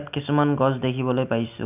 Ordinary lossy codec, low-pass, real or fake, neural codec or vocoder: none; 3.6 kHz; real; none